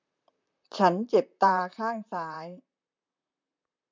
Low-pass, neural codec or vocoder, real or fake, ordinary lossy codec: 7.2 kHz; vocoder, 44.1 kHz, 128 mel bands, Pupu-Vocoder; fake; MP3, 64 kbps